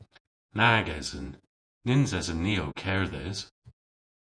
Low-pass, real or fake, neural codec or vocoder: 9.9 kHz; fake; vocoder, 48 kHz, 128 mel bands, Vocos